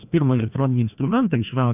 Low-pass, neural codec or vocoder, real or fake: 3.6 kHz; codec, 16 kHz, 1 kbps, FreqCodec, larger model; fake